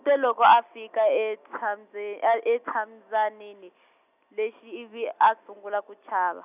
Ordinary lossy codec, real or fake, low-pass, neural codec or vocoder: none; real; 3.6 kHz; none